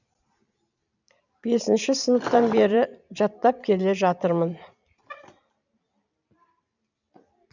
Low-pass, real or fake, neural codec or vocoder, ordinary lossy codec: 7.2 kHz; fake; vocoder, 44.1 kHz, 128 mel bands every 256 samples, BigVGAN v2; none